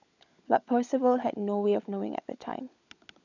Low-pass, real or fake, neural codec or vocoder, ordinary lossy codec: 7.2 kHz; fake; codec, 16 kHz, 16 kbps, FunCodec, trained on LibriTTS, 50 frames a second; none